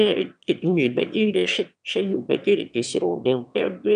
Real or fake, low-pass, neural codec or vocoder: fake; 9.9 kHz; autoencoder, 22.05 kHz, a latent of 192 numbers a frame, VITS, trained on one speaker